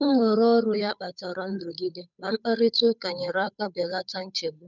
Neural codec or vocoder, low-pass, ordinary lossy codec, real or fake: codec, 16 kHz, 8 kbps, FunCodec, trained on Chinese and English, 25 frames a second; 7.2 kHz; none; fake